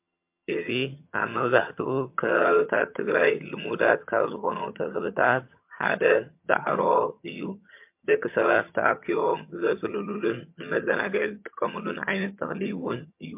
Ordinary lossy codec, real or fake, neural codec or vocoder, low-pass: MP3, 32 kbps; fake; vocoder, 22.05 kHz, 80 mel bands, HiFi-GAN; 3.6 kHz